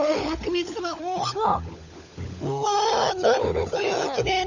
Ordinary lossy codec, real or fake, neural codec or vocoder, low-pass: none; fake; codec, 16 kHz, 16 kbps, FunCodec, trained on LibriTTS, 50 frames a second; 7.2 kHz